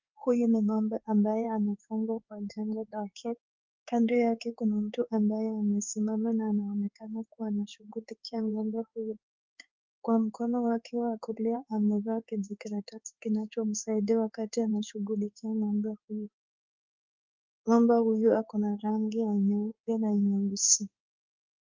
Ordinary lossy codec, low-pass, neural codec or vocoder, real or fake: Opus, 32 kbps; 7.2 kHz; codec, 16 kHz in and 24 kHz out, 1 kbps, XY-Tokenizer; fake